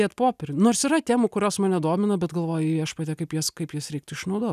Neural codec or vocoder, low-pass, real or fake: none; 14.4 kHz; real